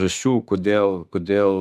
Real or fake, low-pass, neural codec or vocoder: fake; 14.4 kHz; autoencoder, 48 kHz, 32 numbers a frame, DAC-VAE, trained on Japanese speech